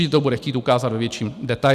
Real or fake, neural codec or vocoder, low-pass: real; none; 14.4 kHz